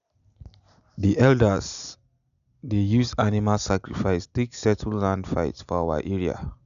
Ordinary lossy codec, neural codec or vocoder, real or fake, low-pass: none; none; real; 7.2 kHz